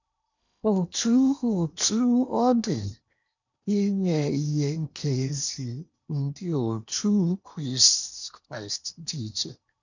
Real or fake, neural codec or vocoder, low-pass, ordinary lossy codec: fake; codec, 16 kHz in and 24 kHz out, 0.8 kbps, FocalCodec, streaming, 65536 codes; 7.2 kHz; none